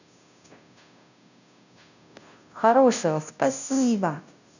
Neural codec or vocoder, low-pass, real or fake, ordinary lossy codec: codec, 16 kHz, 0.5 kbps, FunCodec, trained on Chinese and English, 25 frames a second; 7.2 kHz; fake; none